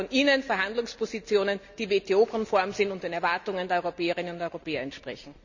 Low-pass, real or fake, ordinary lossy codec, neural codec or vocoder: 7.2 kHz; real; none; none